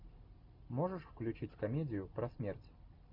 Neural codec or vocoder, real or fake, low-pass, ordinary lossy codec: none; real; 5.4 kHz; MP3, 48 kbps